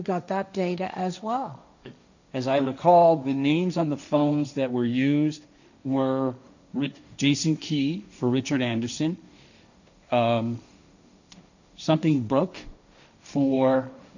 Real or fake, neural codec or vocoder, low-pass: fake; codec, 16 kHz, 1.1 kbps, Voila-Tokenizer; 7.2 kHz